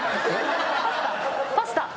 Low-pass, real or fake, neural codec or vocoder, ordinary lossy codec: none; real; none; none